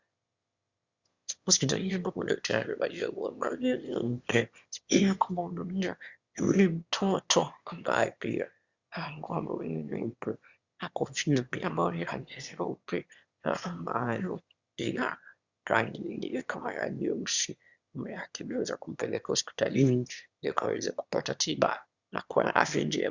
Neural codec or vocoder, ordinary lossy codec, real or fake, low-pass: autoencoder, 22.05 kHz, a latent of 192 numbers a frame, VITS, trained on one speaker; Opus, 64 kbps; fake; 7.2 kHz